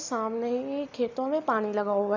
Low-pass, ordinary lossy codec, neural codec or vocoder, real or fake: 7.2 kHz; AAC, 48 kbps; none; real